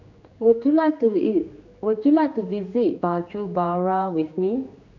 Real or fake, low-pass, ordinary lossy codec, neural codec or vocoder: fake; 7.2 kHz; none; codec, 16 kHz, 2 kbps, X-Codec, HuBERT features, trained on general audio